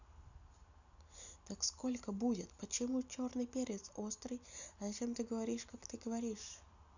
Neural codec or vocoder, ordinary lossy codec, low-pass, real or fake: none; none; 7.2 kHz; real